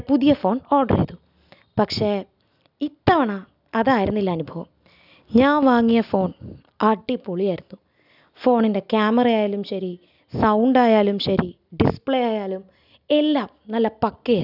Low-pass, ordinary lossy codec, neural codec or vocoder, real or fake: 5.4 kHz; none; none; real